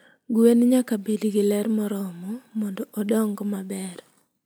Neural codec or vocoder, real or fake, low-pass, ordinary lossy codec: none; real; none; none